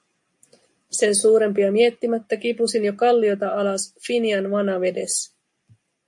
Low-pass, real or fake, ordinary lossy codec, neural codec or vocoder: 10.8 kHz; real; MP3, 48 kbps; none